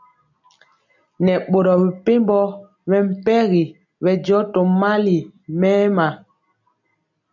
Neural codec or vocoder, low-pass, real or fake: none; 7.2 kHz; real